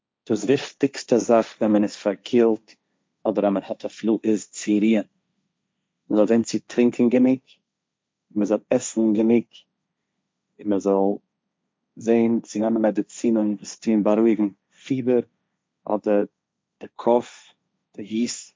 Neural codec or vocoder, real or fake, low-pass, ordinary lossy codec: codec, 16 kHz, 1.1 kbps, Voila-Tokenizer; fake; none; none